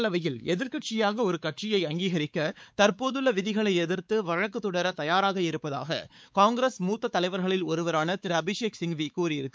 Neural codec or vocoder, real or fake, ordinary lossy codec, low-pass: codec, 16 kHz, 4 kbps, X-Codec, WavLM features, trained on Multilingual LibriSpeech; fake; none; none